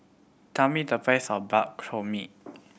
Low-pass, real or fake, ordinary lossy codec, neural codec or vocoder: none; real; none; none